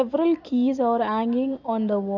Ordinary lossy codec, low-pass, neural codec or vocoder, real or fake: none; 7.2 kHz; none; real